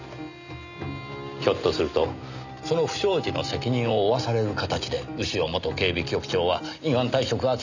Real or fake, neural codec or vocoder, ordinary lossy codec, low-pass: real; none; none; 7.2 kHz